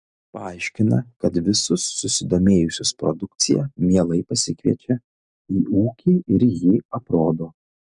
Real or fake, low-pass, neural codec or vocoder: real; 9.9 kHz; none